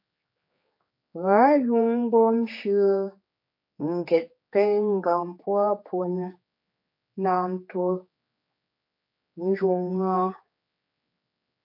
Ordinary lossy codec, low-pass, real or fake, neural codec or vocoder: MP3, 32 kbps; 5.4 kHz; fake; codec, 16 kHz, 4 kbps, X-Codec, HuBERT features, trained on general audio